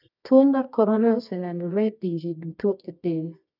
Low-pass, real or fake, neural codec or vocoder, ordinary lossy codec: 5.4 kHz; fake; codec, 24 kHz, 0.9 kbps, WavTokenizer, medium music audio release; none